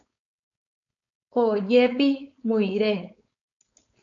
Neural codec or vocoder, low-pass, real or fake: codec, 16 kHz, 4.8 kbps, FACodec; 7.2 kHz; fake